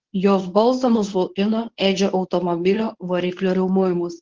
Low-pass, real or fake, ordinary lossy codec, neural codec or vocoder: 7.2 kHz; fake; Opus, 16 kbps; codec, 24 kHz, 0.9 kbps, WavTokenizer, medium speech release version 1